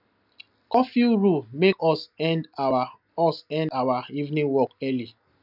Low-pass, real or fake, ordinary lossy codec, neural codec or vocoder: 5.4 kHz; real; none; none